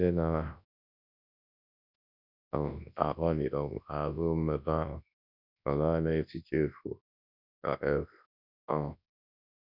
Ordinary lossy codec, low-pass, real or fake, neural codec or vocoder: none; 5.4 kHz; fake; codec, 24 kHz, 0.9 kbps, WavTokenizer, large speech release